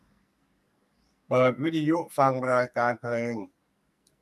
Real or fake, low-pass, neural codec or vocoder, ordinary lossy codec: fake; 14.4 kHz; codec, 44.1 kHz, 2.6 kbps, SNAC; none